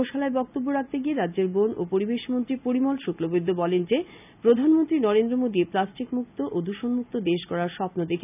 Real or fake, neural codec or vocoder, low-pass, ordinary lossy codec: real; none; 3.6 kHz; none